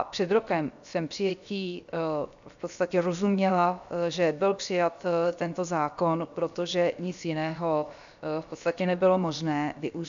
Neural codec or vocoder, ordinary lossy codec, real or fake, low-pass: codec, 16 kHz, 0.7 kbps, FocalCodec; AAC, 96 kbps; fake; 7.2 kHz